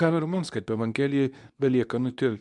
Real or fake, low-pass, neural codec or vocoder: fake; 10.8 kHz; codec, 24 kHz, 0.9 kbps, WavTokenizer, medium speech release version 2